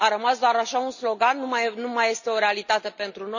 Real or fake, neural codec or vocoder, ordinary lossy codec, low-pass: real; none; none; 7.2 kHz